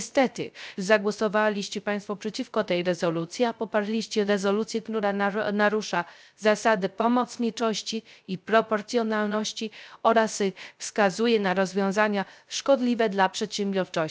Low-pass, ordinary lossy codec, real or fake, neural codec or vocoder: none; none; fake; codec, 16 kHz, 0.3 kbps, FocalCodec